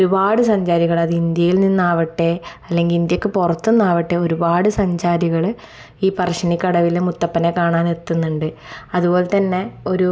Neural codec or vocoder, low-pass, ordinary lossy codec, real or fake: none; none; none; real